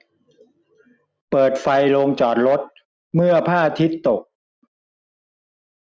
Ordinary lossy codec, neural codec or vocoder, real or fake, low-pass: none; none; real; none